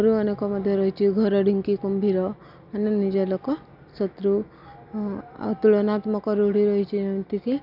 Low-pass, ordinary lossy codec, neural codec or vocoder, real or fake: 5.4 kHz; none; none; real